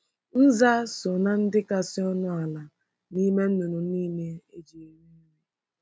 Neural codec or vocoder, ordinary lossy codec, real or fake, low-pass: none; none; real; none